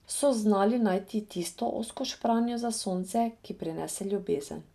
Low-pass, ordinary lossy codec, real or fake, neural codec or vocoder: 14.4 kHz; none; real; none